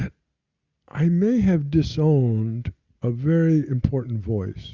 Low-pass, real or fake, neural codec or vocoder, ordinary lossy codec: 7.2 kHz; real; none; Opus, 64 kbps